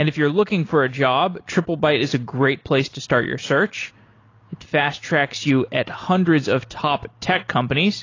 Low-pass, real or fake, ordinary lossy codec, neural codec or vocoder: 7.2 kHz; real; AAC, 32 kbps; none